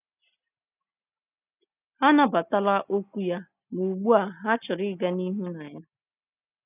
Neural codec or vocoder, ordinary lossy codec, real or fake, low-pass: none; none; real; 3.6 kHz